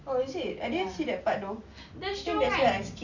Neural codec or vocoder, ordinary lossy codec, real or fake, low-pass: none; none; real; 7.2 kHz